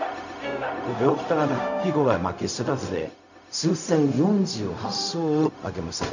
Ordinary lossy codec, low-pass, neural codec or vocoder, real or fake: none; 7.2 kHz; codec, 16 kHz, 0.4 kbps, LongCat-Audio-Codec; fake